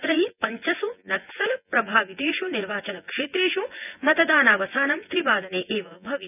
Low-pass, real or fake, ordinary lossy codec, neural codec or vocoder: 3.6 kHz; fake; none; vocoder, 24 kHz, 100 mel bands, Vocos